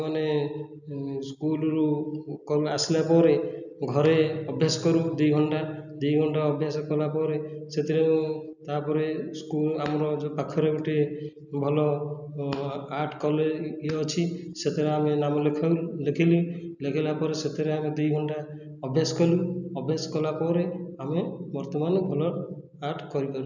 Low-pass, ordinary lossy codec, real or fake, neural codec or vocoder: 7.2 kHz; none; real; none